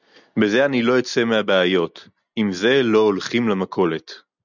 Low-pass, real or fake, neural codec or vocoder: 7.2 kHz; real; none